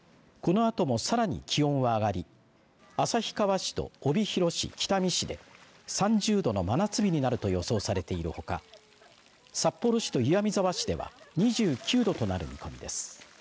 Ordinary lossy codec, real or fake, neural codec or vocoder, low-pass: none; real; none; none